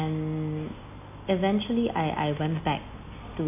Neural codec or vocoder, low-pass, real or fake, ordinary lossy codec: none; 3.6 kHz; real; none